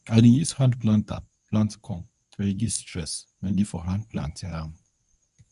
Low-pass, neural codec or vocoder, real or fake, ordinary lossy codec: 10.8 kHz; codec, 24 kHz, 0.9 kbps, WavTokenizer, medium speech release version 1; fake; none